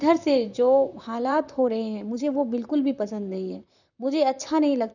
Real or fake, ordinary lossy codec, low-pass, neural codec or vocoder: fake; none; 7.2 kHz; vocoder, 44.1 kHz, 128 mel bands, Pupu-Vocoder